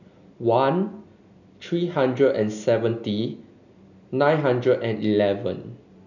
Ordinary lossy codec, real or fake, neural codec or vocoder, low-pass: none; real; none; 7.2 kHz